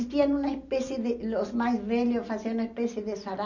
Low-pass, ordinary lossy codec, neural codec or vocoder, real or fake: 7.2 kHz; none; none; real